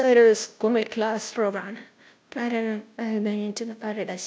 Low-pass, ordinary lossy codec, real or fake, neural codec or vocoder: none; none; fake; codec, 16 kHz, 0.5 kbps, FunCodec, trained on Chinese and English, 25 frames a second